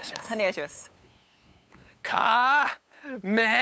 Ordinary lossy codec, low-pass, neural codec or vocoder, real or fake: none; none; codec, 16 kHz, 8 kbps, FunCodec, trained on LibriTTS, 25 frames a second; fake